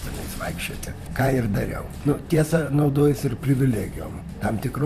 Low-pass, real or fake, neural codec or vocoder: 14.4 kHz; fake; vocoder, 44.1 kHz, 128 mel bands, Pupu-Vocoder